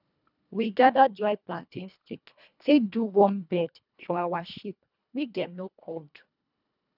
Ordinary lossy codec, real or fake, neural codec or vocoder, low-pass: none; fake; codec, 24 kHz, 1.5 kbps, HILCodec; 5.4 kHz